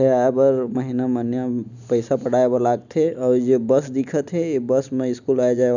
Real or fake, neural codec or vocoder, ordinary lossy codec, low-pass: real; none; none; 7.2 kHz